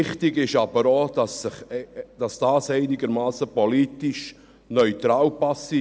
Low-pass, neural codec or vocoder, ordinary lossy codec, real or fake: none; none; none; real